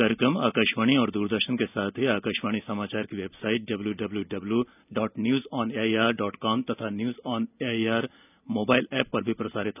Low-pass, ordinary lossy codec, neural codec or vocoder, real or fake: 3.6 kHz; none; none; real